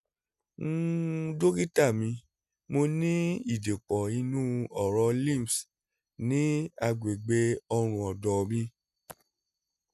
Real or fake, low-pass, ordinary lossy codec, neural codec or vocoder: real; 14.4 kHz; none; none